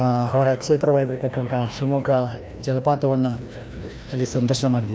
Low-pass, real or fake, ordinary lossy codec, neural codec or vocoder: none; fake; none; codec, 16 kHz, 1 kbps, FreqCodec, larger model